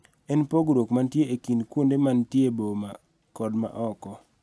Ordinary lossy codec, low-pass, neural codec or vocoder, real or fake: none; none; none; real